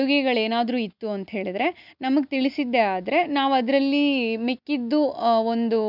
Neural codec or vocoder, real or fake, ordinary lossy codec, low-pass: none; real; none; 5.4 kHz